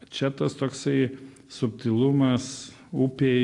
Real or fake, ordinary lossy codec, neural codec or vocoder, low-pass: real; AAC, 48 kbps; none; 10.8 kHz